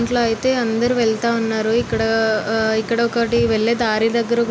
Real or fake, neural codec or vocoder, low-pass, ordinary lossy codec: real; none; none; none